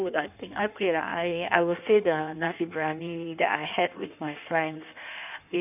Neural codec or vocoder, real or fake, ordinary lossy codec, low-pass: codec, 16 kHz in and 24 kHz out, 1.1 kbps, FireRedTTS-2 codec; fake; none; 3.6 kHz